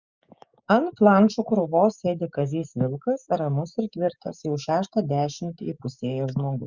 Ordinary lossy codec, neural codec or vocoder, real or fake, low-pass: Opus, 64 kbps; codec, 16 kHz, 6 kbps, DAC; fake; 7.2 kHz